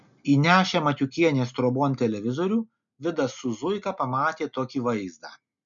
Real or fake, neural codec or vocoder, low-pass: real; none; 7.2 kHz